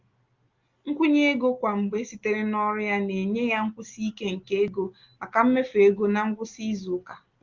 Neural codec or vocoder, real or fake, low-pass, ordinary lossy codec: none; real; 7.2 kHz; Opus, 32 kbps